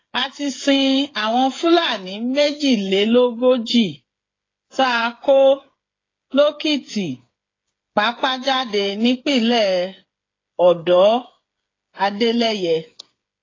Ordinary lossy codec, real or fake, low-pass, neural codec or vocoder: AAC, 32 kbps; fake; 7.2 kHz; codec, 16 kHz, 8 kbps, FreqCodec, smaller model